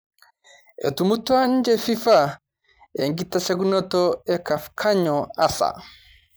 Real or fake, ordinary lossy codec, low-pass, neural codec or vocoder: real; none; none; none